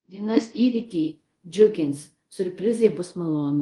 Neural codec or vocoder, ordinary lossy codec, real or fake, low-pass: codec, 24 kHz, 0.5 kbps, DualCodec; Opus, 24 kbps; fake; 10.8 kHz